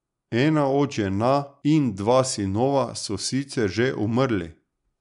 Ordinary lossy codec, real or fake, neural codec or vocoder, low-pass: none; real; none; 10.8 kHz